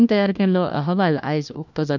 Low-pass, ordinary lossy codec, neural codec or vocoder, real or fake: 7.2 kHz; none; codec, 16 kHz, 1 kbps, FunCodec, trained on LibriTTS, 50 frames a second; fake